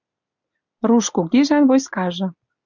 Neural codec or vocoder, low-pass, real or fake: none; 7.2 kHz; real